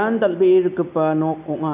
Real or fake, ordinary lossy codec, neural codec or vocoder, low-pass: real; none; none; 3.6 kHz